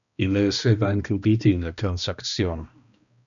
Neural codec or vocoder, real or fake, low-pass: codec, 16 kHz, 1 kbps, X-Codec, HuBERT features, trained on balanced general audio; fake; 7.2 kHz